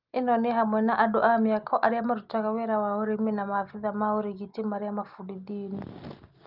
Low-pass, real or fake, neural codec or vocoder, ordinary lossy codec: 5.4 kHz; real; none; Opus, 32 kbps